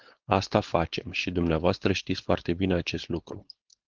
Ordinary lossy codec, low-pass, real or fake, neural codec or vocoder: Opus, 16 kbps; 7.2 kHz; fake; codec, 16 kHz, 4.8 kbps, FACodec